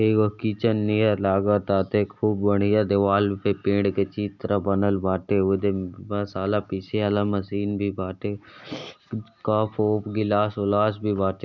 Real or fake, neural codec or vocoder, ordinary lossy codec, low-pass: real; none; none; 7.2 kHz